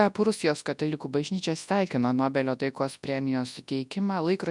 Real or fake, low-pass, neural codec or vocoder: fake; 10.8 kHz; codec, 24 kHz, 0.9 kbps, WavTokenizer, large speech release